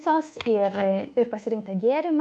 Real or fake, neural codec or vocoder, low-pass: fake; codec, 24 kHz, 1.2 kbps, DualCodec; 10.8 kHz